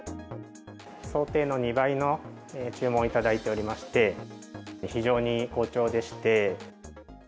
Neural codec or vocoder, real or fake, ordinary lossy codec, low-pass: none; real; none; none